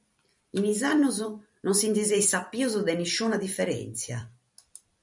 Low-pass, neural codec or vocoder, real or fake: 10.8 kHz; vocoder, 44.1 kHz, 128 mel bands every 256 samples, BigVGAN v2; fake